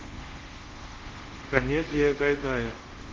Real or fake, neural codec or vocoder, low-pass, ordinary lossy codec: fake; codec, 24 kHz, 0.5 kbps, DualCodec; 7.2 kHz; Opus, 16 kbps